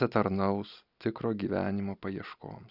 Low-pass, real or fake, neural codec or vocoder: 5.4 kHz; real; none